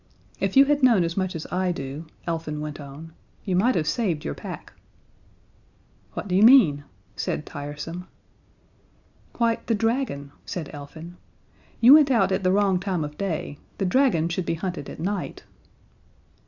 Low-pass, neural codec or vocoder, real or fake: 7.2 kHz; none; real